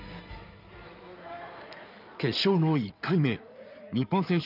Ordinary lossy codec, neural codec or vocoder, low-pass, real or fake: none; codec, 16 kHz in and 24 kHz out, 2.2 kbps, FireRedTTS-2 codec; 5.4 kHz; fake